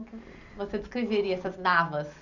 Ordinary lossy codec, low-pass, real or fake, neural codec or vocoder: none; 7.2 kHz; real; none